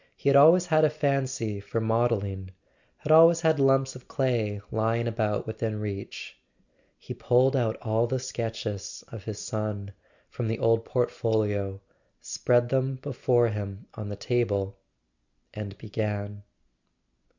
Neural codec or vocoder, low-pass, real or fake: none; 7.2 kHz; real